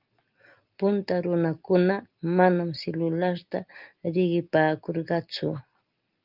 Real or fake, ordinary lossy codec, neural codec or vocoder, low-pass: real; Opus, 32 kbps; none; 5.4 kHz